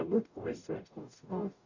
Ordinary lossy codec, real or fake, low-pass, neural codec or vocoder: none; fake; 7.2 kHz; codec, 44.1 kHz, 0.9 kbps, DAC